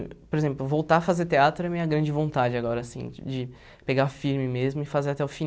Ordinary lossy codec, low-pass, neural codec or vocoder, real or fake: none; none; none; real